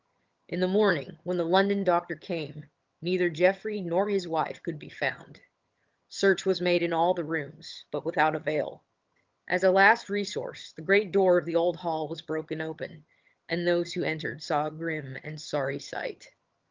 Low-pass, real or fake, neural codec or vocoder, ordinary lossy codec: 7.2 kHz; fake; vocoder, 22.05 kHz, 80 mel bands, HiFi-GAN; Opus, 32 kbps